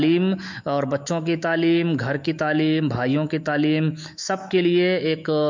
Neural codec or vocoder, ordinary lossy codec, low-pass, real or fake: autoencoder, 48 kHz, 128 numbers a frame, DAC-VAE, trained on Japanese speech; MP3, 64 kbps; 7.2 kHz; fake